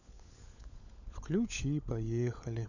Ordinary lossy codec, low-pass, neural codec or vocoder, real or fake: none; 7.2 kHz; codec, 16 kHz, 16 kbps, FunCodec, trained on LibriTTS, 50 frames a second; fake